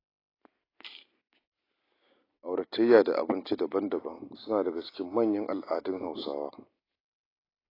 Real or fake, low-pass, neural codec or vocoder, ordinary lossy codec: real; 5.4 kHz; none; AAC, 24 kbps